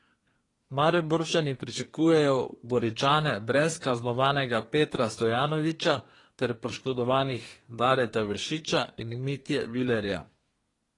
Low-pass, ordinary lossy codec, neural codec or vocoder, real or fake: 10.8 kHz; AAC, 32 kbps; codec, 24 kHz, 1 kbps, SNAC; fake